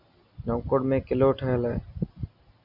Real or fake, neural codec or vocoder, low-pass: real; none; 5.4 kHz